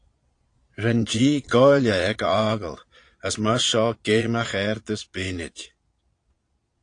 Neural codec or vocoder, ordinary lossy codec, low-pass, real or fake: vocoder, 22.05 kHz, 80 mel bands, Vocos; AAC, 48 kbps; 9.9 kHz; fake